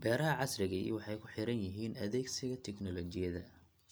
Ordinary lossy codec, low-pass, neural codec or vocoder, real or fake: none; none; none; real